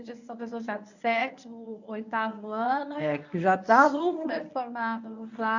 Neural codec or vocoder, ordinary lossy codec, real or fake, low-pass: codec, 24 kHz, 0.9 kbps, WavTokenizer, medium speech release version 1; none; fake; 7.2 kHz